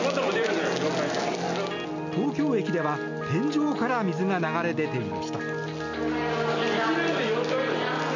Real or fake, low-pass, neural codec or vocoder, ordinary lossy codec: real; 7.2 kHz; none; none